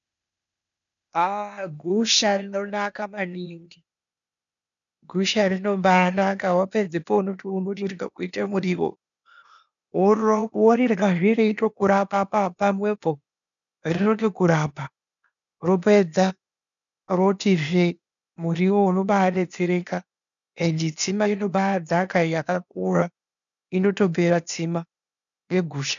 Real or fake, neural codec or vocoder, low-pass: fake; codec, 16 kHz, 0.8 kbps, ZipCodec; 7.2 kHz